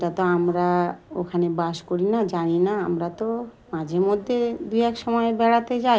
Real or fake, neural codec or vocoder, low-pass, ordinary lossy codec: real; none; none; none